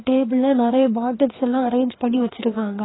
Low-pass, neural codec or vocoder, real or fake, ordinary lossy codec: 7.2 kHz; codec, 16 kHz, 2 kbps, FreqCodec, larger model; fake; AAC, 16 kbps